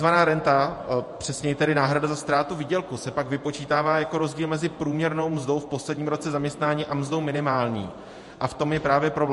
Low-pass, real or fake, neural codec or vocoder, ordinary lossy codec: 14.4 kHz; fake; vocoder, 48 kHz, 128 mel bands, Vocos; MP3, 48 kbps